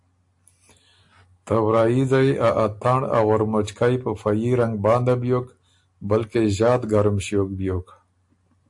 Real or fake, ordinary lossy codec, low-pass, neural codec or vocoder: real; AAC, 64 kbps; 10.8 kHz; none